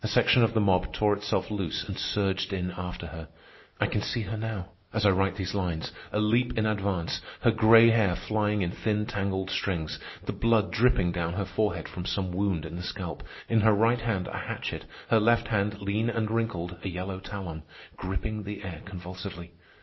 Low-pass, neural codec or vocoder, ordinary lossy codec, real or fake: 7.2 kHz; none; MP3, 24 kbps; real